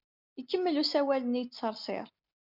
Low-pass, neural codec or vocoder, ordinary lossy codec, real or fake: 5.4 kHz; none; MP3, 48 kbps; real